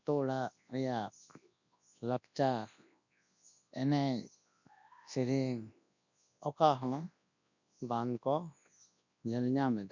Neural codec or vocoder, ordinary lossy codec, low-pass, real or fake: codec, 24 kHz, 0.9 kbps, WavTokenizer, large speech release; none; 7.2 kHz; fake